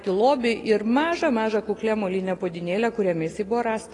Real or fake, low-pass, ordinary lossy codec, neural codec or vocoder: real; 10.8 kHz; AAC, 32 kbps; none